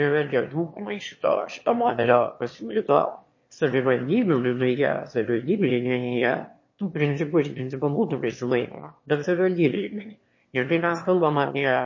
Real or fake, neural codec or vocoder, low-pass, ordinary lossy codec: fake; autoencoder, 22.05 kHz, a latent of 192 numbers a frame, VITS, trained on one speaker; 7.2 kHz; MP3, 32 kbps